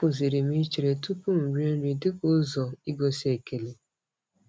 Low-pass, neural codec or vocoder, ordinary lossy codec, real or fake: none; none; none; real